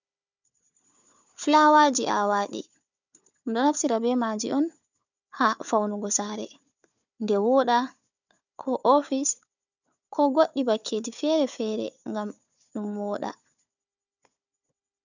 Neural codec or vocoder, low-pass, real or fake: codec, 16 kHz, 4 kbps, FunCodec, trained on Chinese and English, 50 frames a second; 7.2 kHz; fake